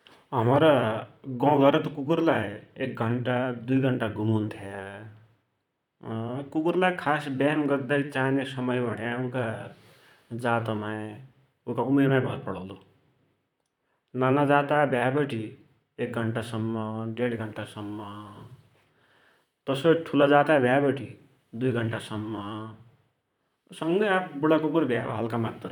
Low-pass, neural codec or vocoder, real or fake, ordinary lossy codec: 19.8 kHz; vocoder, 44.1 kHz, 128 mel bands, Pupu-Vocoder; fake; none